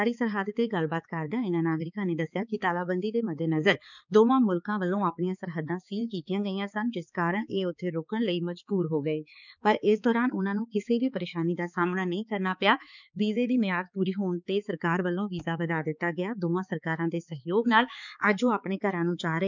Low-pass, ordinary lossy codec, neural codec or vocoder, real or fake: 7.2 kHz; none; codec, 16 kHz, 4 kbps, X-Codec, HuBERT features, trained on balanced general audio; fake